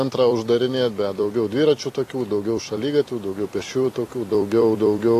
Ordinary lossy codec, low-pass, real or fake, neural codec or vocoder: AAC, 48 kbps; 14.4 kHz; fake; vocoder, 44.1 kHz, 128 mel bands every 256 samples, BigVGAN v2